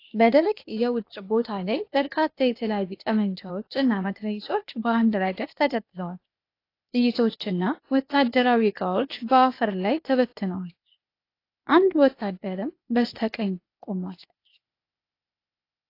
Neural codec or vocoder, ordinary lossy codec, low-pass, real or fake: codec, 16 kHz, 0.8 kbps, ZipCodec; AAC, 32 kbps; 5.4 kHz; fake